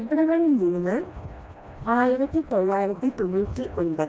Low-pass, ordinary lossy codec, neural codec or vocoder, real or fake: none; none; codec, 16 kHz, 1 kbps, FreqCodec, smaller model; fake